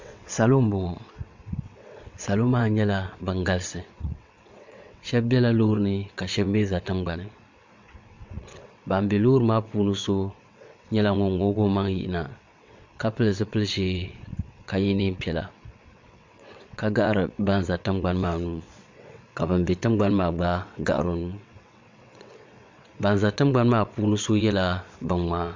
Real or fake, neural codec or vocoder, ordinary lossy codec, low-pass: fake; vocoder, 22.05 kHz, 80 mel bands, WaveNeXt; MP3, 64 kbps; 7.2 kHz